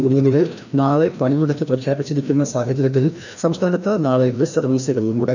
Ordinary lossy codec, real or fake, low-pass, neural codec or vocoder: none; fake; 7.2 kHz; codec, 16 kHz, 1 kbps, FreqCodec, larger model